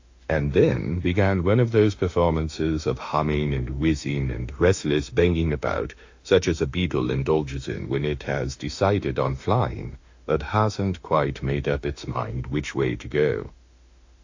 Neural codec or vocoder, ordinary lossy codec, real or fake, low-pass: autoencoder, 48 kHz, 32 numbers a frame, DAC-VAE, trained on Japanese speech; AAC, 48 kbps; fake; 7.2 kHz